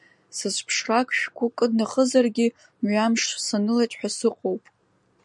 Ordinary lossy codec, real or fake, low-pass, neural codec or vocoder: MP3, 96 kbps; real; 10.8 kHz; none